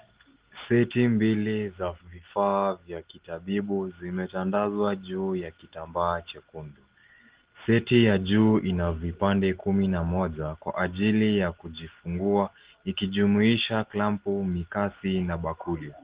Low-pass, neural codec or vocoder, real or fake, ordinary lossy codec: 3.6 kHz; none; real; Opus, 16 kbps